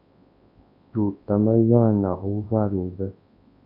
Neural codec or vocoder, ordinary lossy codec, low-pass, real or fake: codec, 24 kHz, 0.9 kbps, WavTokenizer, large speech release; AAC, 32 kbps; 5.4 kHz; fake